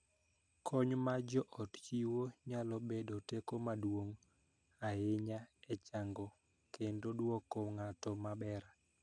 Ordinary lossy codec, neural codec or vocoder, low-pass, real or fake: none; none; 9.9 kHz; real